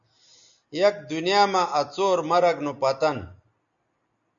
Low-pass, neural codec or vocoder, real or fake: 7.2 kHz; none; real